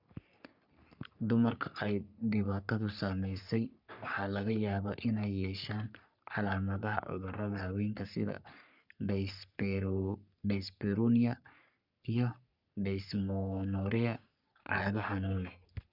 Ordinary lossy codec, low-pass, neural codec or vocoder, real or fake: none; 5.4 kHz; codec, 44.1 kHz, 3.4 kbps, Pupu-Codec; fake